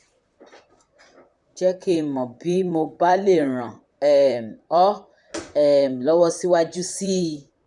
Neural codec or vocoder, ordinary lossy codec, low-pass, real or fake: vocoder, 44.1 kHz, 128 mel bands, Pupu-Vocoder; none; 10.8 kHz; fake